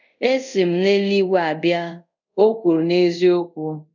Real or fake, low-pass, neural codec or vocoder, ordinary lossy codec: fake; 7.2 kHz; codec, 24 kHz, 0.5 kbps, DualCodec; none